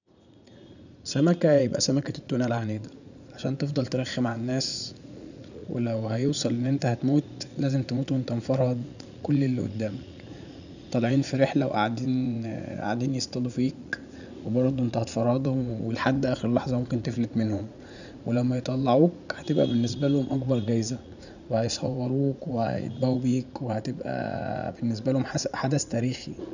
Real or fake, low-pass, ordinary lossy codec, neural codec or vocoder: fake; 7.2 kHz; none; vocoder, 44.1 kHz, 80 mel bands, Vocos